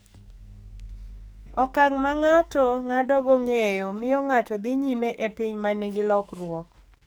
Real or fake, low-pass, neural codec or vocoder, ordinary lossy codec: fake; none; codec, 44.1 kHz, 2.6 kbps, SNAC; none